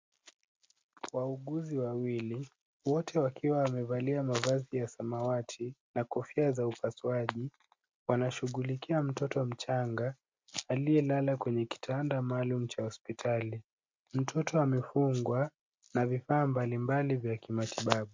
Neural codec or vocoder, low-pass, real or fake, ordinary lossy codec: none; 7.2 kHz; real; MP3, 64 kbps